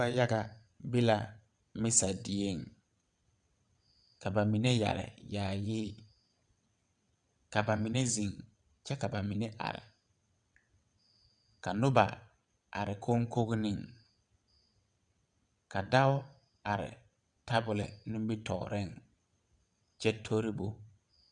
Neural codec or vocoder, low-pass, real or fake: vocoder, 22.05 kHz, 80 mel bands, WaveNeXt; 9.9 kHz; fake